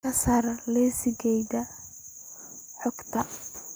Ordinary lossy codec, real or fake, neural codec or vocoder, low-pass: none; real; none; none